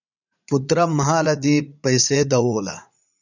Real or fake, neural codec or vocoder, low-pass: fake; vocoder, 44.1 kHz, 80 mel bands, Vocos; 7.2 kHz